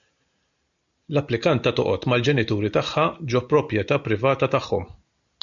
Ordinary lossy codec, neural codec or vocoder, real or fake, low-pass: MP3, 96 kbps; none; real; 7.2 kHz